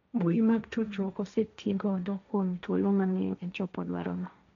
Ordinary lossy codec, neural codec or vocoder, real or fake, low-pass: none; codec, 16 kHz, 1.1 kbps, Voila-Tokenizer; fake; 7.2 kHz